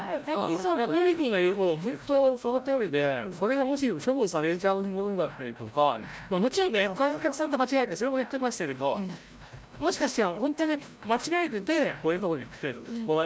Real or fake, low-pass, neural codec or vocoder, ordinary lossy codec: fake; none; codec, 16 kHz, 0.5 kbps, FreqCodec, larger model; none